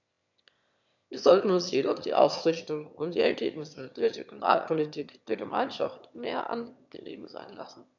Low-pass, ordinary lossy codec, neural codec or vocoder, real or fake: 7.2 kHz; none; autoencoder, 22.05 kHz, a latent of 192 numbers a frame, VITS, trained on one speaker; fake